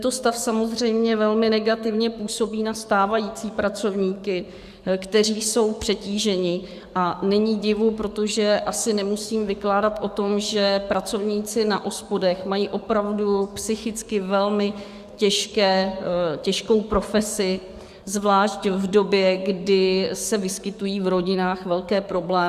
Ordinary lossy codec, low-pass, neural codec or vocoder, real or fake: Opus, 64 kbps; 14.4 kHz; codec, 44.1 kHz, 7.8 kbps, DAC; fake